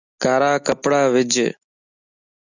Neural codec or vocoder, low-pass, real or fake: none; 7.2 kHz; real